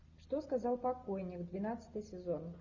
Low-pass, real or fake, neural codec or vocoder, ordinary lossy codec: 7.2 kHz; real; none; Opus, 64 kbps